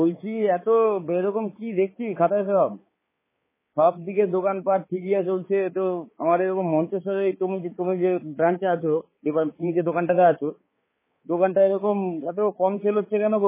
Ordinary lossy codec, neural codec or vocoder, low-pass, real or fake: MP3, 16 kbps; codec, 16 kHz, 4 kbps, X-Codec, HuBERT features, trained on balanced general audio; 3.6 kHz; fake